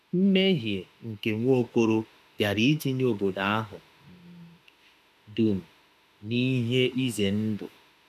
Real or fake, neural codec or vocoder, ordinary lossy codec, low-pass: fake; autoencoder, 48 kHz, 32 numbers a frame, DAC-VAE, trained on Japanese speech; none; 14.4 kHz